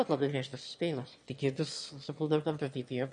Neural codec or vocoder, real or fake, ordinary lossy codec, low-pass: autoencoder, 22.05 kHz, a latent of 192 numbers a frame, VITS, trained on one speaker; fake; MP3, 48 kbps; 9.9 kHz